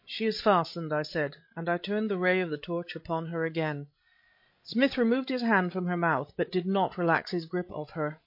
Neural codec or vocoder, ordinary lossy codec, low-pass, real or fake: codec, 16 kHz, 16 kbps, FreqCodec, larger model; MP3, 32 kbps; 5.4 kHz; fake